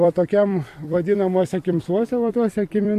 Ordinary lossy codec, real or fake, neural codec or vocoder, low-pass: MP3, 64 kbps; fake; vocoder, 44.1 kHz, 128 mel bands every 256 samples, BigVGAN v2; 14.4 kHz